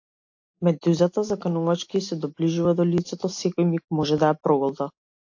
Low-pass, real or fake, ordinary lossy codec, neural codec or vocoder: 7.2 kHz; real; AAC, 48 kbps; none